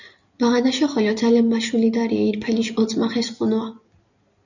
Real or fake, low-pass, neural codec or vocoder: real; 7.2 kHz; none